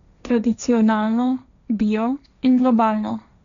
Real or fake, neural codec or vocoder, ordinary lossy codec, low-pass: fake; codec, 16 kHz, 1.1 kbps, Voila-Tokenizer; none; 7.2 kHz